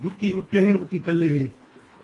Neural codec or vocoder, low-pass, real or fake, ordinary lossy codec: codec, 24 kHz, 1.5 kbps, HILCodec; 10.8 kHz; fake; AAC, 32 kbps